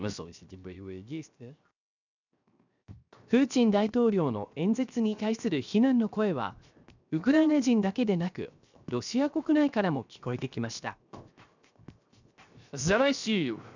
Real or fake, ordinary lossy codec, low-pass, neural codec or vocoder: fake; none; 7.2 kHz; codec, 16 kHz, 0.7 kbps, FocalCodec